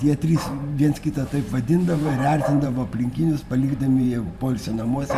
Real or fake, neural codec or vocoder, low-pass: fake; vocoder, 44.1 kHz, 128 mel bands every 512 samples, BigVGAN v2; 14.4 kHz